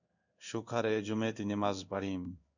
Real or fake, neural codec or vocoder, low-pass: fake; codec, 16 kHz in and 24 kHz out, 1 kbps, XY-Tokenizer; 7.2 kHz